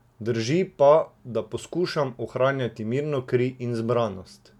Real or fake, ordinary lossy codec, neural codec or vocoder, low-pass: real; none; none; 19.8 kHz